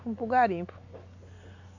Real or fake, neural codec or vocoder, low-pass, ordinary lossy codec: real; none; 7.2 kHz; AAC, 48 kbps